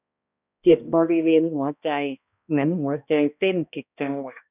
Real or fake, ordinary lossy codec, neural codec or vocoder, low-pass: fake; none; codec, 16 kHz, 1 kbps, X-Codec, HuBERT features, trained on balanced general audio; 3.6 kHz